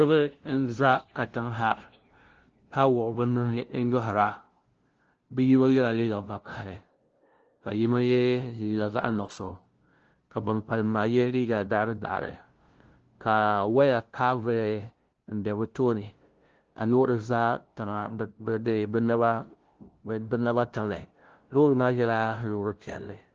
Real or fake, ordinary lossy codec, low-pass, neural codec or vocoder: fake; Opus, 16 kbps; 7.2 kHz; codec, 16 kHz, 0.5 kbps, FunCodec, trained on LibriTTS, 25 frames a second